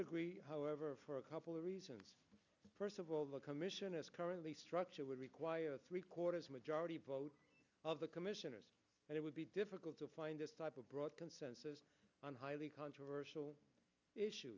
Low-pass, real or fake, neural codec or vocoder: 7.2 kHz; real; none